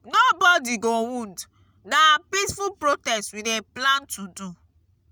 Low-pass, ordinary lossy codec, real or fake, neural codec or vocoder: none; none; real; none